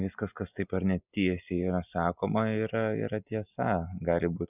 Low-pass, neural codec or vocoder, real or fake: 3.6 kHz; none; real